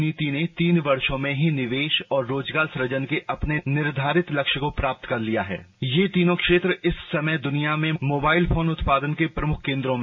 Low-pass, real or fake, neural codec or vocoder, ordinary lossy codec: 7.2 kHz; real; none; MP3, 32 kbps